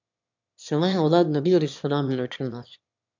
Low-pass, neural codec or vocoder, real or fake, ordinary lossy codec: 7.2 kHz; autoencoder, 22.05 kHz, a latent of 192 numbers a frame, VITS, trained on one speaker; fake; MP3, 64 kbps